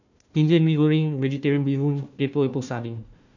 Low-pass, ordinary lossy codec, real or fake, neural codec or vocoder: 7.2 kHz; none; fake; codec, 16 kHz, 1 kbps, FunCodec, trained on Chinese and English, 50 frames a second